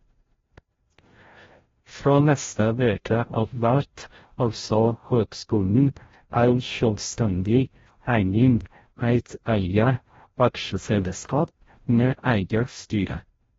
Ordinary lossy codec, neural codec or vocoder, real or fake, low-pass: AAC, 24 kbps; codec, 16 kHz, 0.5 kbps, FreqCodec, larger model; fake; 7.2 kHz